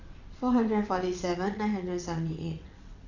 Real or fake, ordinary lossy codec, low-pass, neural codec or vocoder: fake; none; 7.2 kHz; vocoder, 22.05 kHz, 80 mel bands, WaveNeXt